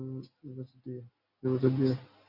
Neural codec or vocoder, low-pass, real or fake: none; 5.4 kHz; real